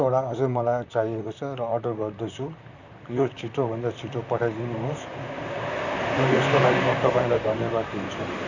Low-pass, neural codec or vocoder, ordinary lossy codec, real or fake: 7.2 kHz; vocoder, 44.1 kHz, 128 mel bands, Pupu-Vocoder; Opus, 64 kbps; fake